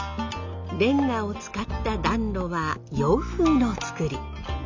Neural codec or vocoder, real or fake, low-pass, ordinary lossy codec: none; real; 7.2 kHz; MP3, 64 kbps